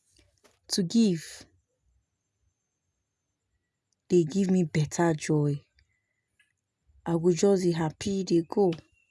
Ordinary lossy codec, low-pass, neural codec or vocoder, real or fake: none; none; none; real